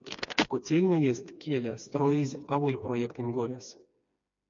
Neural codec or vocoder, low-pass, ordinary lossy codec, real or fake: codec, 16 kHz, 2 kbps, FreqCodec, smaller model; 7.2 kHz; MP3, 48 kbps; fake